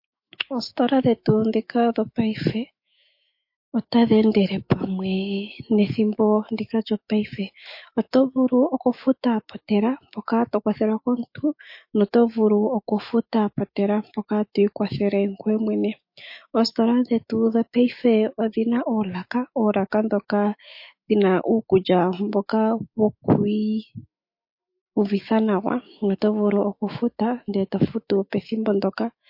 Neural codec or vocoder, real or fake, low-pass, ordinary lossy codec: autoencoder, 48 kHz, 128 numbers a frame, DAC-VAE, trained on Japanese speech; fake; 5.4 kHz; MP3, 32 kbps